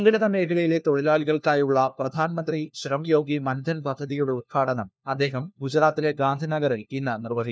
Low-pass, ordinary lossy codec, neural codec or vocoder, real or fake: none; none; codec, 16 kHz, 1 kbps, FunCodec, trained on LibriTTS, 50 frames a second; fake